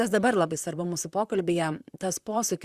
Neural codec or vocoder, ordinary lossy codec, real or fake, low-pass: vocoder, 44.1 kHz, 128 mel bands, Pupu-Vocoder; Opus, 64 kbps; fake; 14.4 kHz